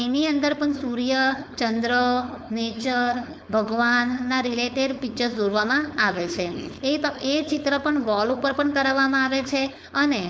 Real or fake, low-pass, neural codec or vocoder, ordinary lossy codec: fake; none; codec, 16 kHz, 4.8 kbps, FACodec; none